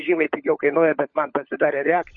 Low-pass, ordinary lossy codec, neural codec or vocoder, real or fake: 7.2 kHz; MP3, 32 kbps; codec, 16 kHz, 16 kbps, FunCodec, trained on LibriTTS, 50 frames a second; fake